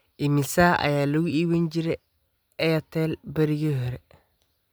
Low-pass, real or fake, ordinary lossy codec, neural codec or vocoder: none; real; none; none